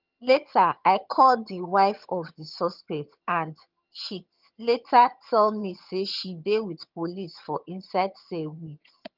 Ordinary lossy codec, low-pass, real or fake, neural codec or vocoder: Opus, 24 kbps; 5.4 kHz; fake; vocoder, 22.05 kHz, 80 mel bands, HiFi-GAN